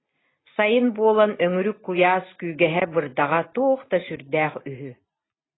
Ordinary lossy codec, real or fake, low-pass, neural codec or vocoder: AAC, 16 kbps; real; 7.2 kHz; none